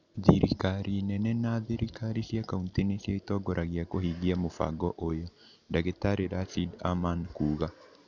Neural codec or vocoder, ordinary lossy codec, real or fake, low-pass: none; none; real; 7.2 kHz